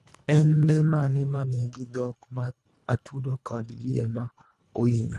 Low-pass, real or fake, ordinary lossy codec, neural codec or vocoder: none; fake; none; codec, 24 kHz, 1.5 kbps, HILCodec